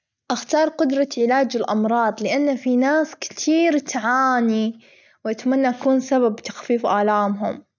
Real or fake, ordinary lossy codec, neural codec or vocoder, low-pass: real; none; none; 7.2 kHz